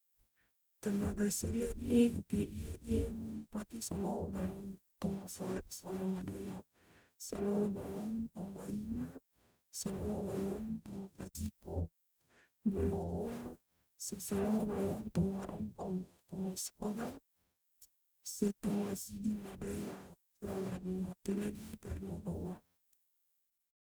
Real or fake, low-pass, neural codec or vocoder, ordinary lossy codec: fake; none; codec, 44.1 kHz, 0.9 kbps, DAC; none